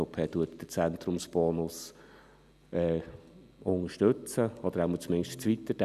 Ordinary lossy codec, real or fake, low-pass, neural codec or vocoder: none; real; 14.4 kHz; none